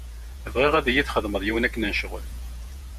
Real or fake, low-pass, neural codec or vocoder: fake; 14.4 kHz; vocoder, 48 kHz, 128 mel bands, Vocos